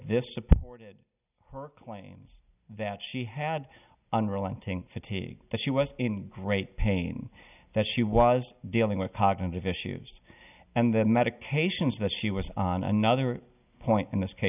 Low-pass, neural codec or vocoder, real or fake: 3.6 kHz; none; real